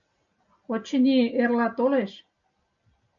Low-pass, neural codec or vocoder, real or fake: 7.2 kHz; none; real